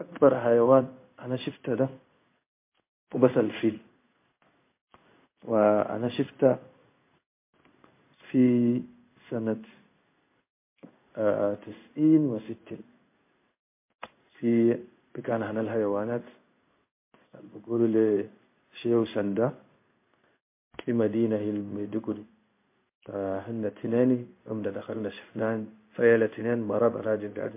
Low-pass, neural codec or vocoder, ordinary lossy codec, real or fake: 3.6 kHz; codec, 16 kHz in and 24 kHz out, 1 kbps, XY-Tokenizer; MP3, 24 kbps; fake